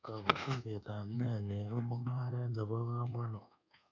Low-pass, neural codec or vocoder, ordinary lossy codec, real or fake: 7.2 kHz; codec, 24 kHz, 1.2 kbps, DualCodec; none; fake